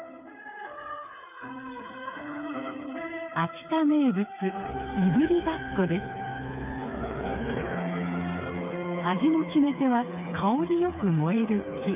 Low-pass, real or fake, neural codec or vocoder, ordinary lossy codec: 3.6 kHz; fake; codec, 16 kHz, 4 kbps, FreqCodec, smaller model; none